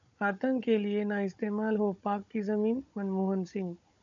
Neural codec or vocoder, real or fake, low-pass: codec, 16 kHz, 16 kbps, FunCodec, trained on Chinese and English, 50 frames a second; fake; 7.2 kHz